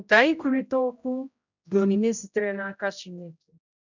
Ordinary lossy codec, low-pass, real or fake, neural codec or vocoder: none; 7.2 kHz; fake; codec, 16 kHz, 0.5 kbps, X-Codec, HuBERT features, trained on general audio